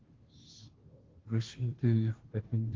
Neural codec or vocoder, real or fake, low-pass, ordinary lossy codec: codec, 16 kHz, 0.5 kbps, FunCodec, trained on Chinese and English, 25 frames a second; fake; 7.2 kHz; Opus, 16 kbps